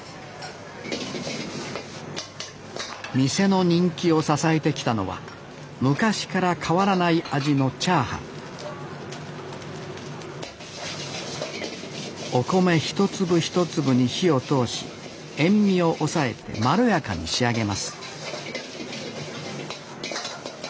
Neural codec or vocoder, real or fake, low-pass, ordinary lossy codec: none; real; none; none